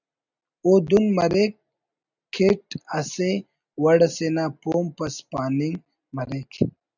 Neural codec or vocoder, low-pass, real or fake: none; 7.2 kHz; real